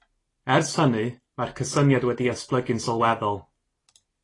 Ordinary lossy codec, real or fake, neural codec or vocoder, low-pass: AAC, 32 kbps; real; none; 10.8 kHz